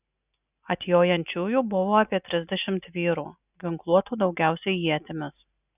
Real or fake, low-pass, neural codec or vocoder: real; 3.6 kHz; none